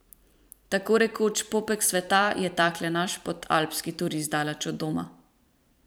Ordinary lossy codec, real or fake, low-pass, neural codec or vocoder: none; fake; none; vocoder, 44.1 kHz, 128 mel bands every 512 samples, BigVGAN v2